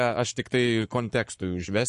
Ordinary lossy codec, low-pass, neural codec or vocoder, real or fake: MP3, 48 kbps; 14.4 kHz; codec, 44.1 kHz, 7.8 kbps, Pupu-Codec; fake